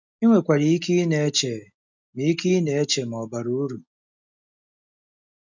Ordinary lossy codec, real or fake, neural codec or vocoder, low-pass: none; real; none; 7.2 kHz